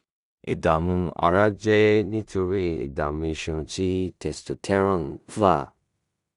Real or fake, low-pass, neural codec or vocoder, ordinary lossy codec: fake; 10.8 kHz; codec, 16 kHz in and 24 kHz out, 0.4 kbps, LongCat-Audio-Codec, two codebook decoder; Opus, 64 kbps